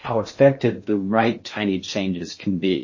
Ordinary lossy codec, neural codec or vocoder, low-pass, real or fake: MP3, 32 kbps; codec, 16 kHz in and 24 kHz out, 0.6 kbps, FocalCodec, streaming, 4096 codes; 7.2 kHz; fake